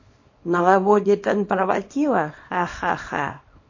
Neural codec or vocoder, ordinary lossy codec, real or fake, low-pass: codec, 24 kHz, 0.9 kbps, WavTokenizer, small release; MP3, 32 kbps; fake; 7.2 kHz